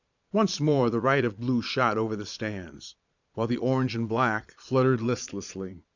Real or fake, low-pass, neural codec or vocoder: fake; 7.2 kHz; vocoder, 22.05 kHz, 80 mel bands, Vocos